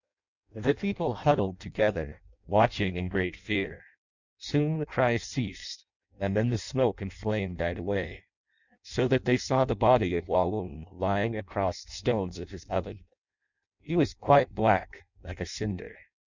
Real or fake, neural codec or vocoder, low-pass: fake; codec, 16 kHz in and 24 kHz out, 0.6 kbps, FireRedTTS-2 codec; 7.2 kHz